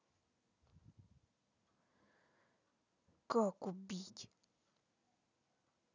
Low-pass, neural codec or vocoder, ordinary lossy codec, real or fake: 7.2 kHz; none; none; real